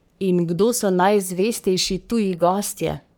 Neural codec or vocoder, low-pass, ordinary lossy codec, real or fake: codec, 44.1 kHz, 3.4 kbps, Pupu-Codec; none; none; fake